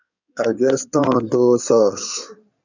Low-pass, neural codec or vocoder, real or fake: 7.2 kHz; codec, 16 kHz in and 24 kHz out, 2.2 kbps, FireRedTTS-2 codec; fake